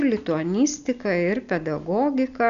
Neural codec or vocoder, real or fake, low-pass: none; real; 7.2 kHz